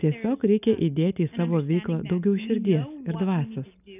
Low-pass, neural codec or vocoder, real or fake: 3.6 kHz; none; real